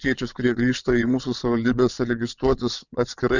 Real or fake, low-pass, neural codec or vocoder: fake; 7.2 kHz; vocoder, 22.05 kHz, 80 mel bands, WaveNeXt